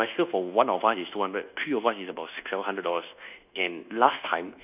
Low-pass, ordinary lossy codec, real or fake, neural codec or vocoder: 3.6 kHz; none; fake; codec, 24 kHz, 1.2 kbps, DualCodec